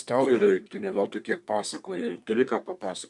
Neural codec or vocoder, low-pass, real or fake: codec, 24 kHz, 1 kbps, SNAC; 10.8 kHz; fake